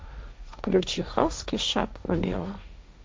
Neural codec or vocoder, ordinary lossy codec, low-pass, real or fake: codec, 16 kHz, 1.1 kbps, Voila-Tokenizer; none; none; fake